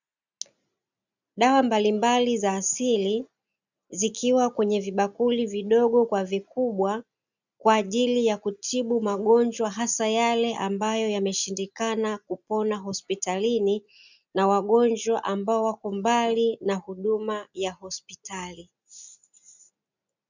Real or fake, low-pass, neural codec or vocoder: real; 7.2 kHz; none